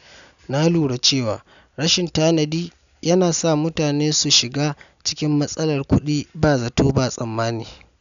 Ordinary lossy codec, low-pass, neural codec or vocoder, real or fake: none; 7.2 kHz; none; real